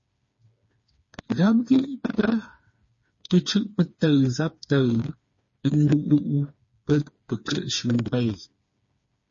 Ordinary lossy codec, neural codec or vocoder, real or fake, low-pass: MP3, 32 kbps; codec, 16 kHz, 4 kbps, FreqCodec, smaller model; fake; 7.2 kHz